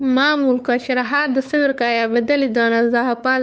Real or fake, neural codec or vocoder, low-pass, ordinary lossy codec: fake; codec, 16 kHz, 4 kbps, X-Codec, WavLM features, trained on Multilingual LibriSpeech; none; none